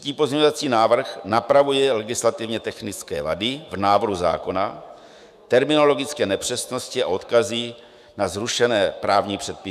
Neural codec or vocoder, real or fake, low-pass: autoencoder, 48 kHz, 128 numbers a frame, DAC-VAE, trained on Japanese speech; fake; 14.4 kHz